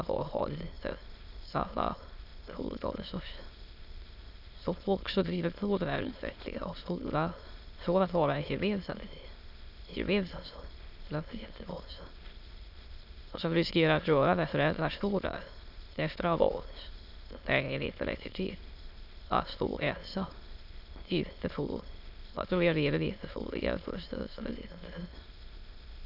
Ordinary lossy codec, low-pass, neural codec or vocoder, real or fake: none; 5.4 kHz; autoencoder, 22.05 kHz, a latent of 192 numbers a frame, VITS, trained on many speakers; fake